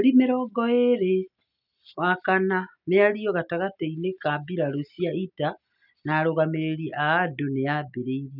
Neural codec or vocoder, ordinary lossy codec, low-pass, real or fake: none; none; 5.4 kHz; real